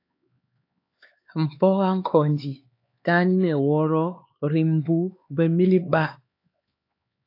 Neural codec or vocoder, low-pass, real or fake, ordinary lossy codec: codec, 16 kHz, 4 kbps, X-Codec, HuBERT features, trained on LibriSpeech; 5.4 kHz; fake; AAC, 32 kbps